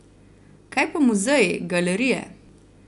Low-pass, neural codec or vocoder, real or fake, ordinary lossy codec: 10.8 kHz; none; real; none